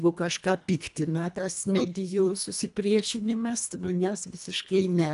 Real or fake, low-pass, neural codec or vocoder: fake; 10.8 kHz; codec, 24 kHz, 1.5 kbps, HILCodec